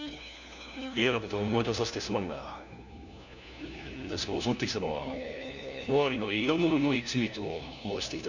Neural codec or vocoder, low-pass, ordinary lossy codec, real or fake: codec, 16 kHz, 1 kbps, FunCodec, trained on LibriTTS, 50 frames a second; 7.2 kHz; none; fake